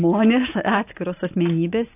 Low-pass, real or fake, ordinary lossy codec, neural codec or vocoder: 3.6 kHz; real; AAC, 24 kbps; none